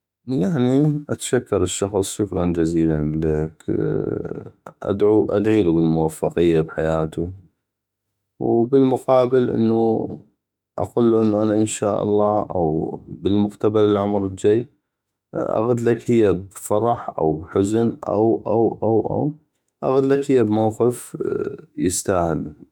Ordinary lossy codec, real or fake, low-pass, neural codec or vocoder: none; fake; 19.8 kHz; autoencoder, 48 kHz, 32 numbers a frame, DAC-VAE, trained on Japanese speech